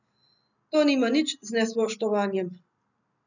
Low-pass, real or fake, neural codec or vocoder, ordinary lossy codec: 7.2 kHz; real; none; none